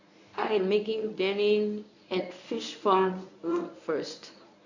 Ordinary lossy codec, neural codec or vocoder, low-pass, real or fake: none; codec, 24 kHz, 0.9 kbps, WavTokenizer, medium speech release version 1; 7.2 kHz; fake